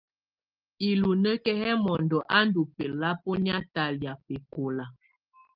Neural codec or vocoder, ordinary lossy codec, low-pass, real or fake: none; Opus, 32 kbps; 5.4 kHz; real